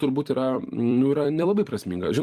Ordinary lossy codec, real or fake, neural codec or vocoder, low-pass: Opus, 32 kbps; fake; vocoder, 44.1 kHz, 128 mel bands every 256 samples, BigVGAN v2; 14.4 kHz